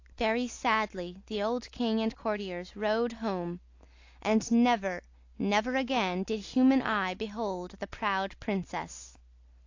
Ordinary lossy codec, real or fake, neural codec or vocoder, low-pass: AAC, 48 kbps; real; none; 7.2 kHz